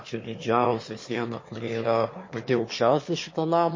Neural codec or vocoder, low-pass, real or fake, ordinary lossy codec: autoencoder, 22.05 kHz, a latent of 192 numbers a frame, VITS, trained on one speaker; 7.2 kHz; fake; MP3, 32 kbps